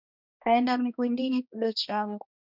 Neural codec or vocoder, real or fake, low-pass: codec, 16 kHz, 1 kbps, X-Codec, HuBERT features, trained on balanced general audio; fake; 5.4 kHz